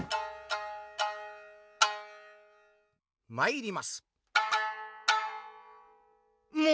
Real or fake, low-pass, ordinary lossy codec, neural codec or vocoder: real; none; none; none